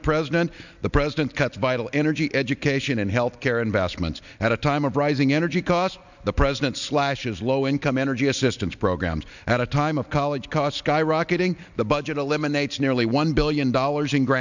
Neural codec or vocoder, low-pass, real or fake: none; 7.2 kHz; real